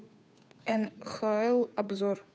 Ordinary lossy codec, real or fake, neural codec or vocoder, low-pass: none; fake; codec, 16 kHz, 2 kbps, FunCodec, trained on Chinese and English, 25 frames a second; none